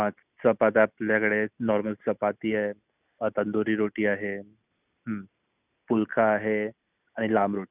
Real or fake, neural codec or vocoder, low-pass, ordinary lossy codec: real; none; 3.6 kHz; none